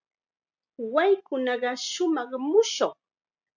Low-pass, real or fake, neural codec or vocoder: 7.2 kHz; real; none